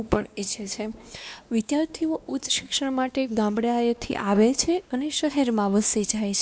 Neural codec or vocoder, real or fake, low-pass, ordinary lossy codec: codec, 16 kHz, 2 kbps, X-Codec, WavLM features, trained on Multilingual LibriSpeech; fake; none; none